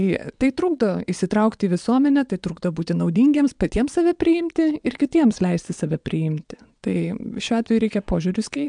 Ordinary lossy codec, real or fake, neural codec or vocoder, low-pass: MP3, 96 kbps; fake; vocoder, 22.05 kHz, 80 mel bands, Vocos; 9.9 kHz